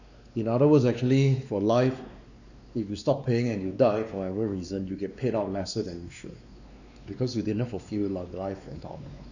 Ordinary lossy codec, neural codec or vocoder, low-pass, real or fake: none; codec, 16 kHz, 2 kbps, X-Codec, WavLM features, trained on Multilingual LibriSpeech; 7.2 kHz; fake